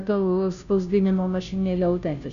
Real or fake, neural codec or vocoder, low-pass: fake; codec, 16 kHz, 0.5 kbps, FunCodec, trained on Chinese and English, 25 frames a second; 7.2 kHz